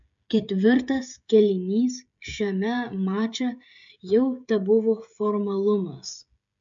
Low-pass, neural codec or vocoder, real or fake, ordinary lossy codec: 7.2 kHz; codec, 16 kHz, 16 kbps, FreqCodec, smaller model; fake; MP3, 64 kbps